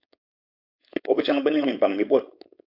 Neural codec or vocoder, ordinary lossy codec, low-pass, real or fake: codec, 16 kHz, 4.8 kbps, FACodec; AAC, 48 kbps; 5.4 kHz; fake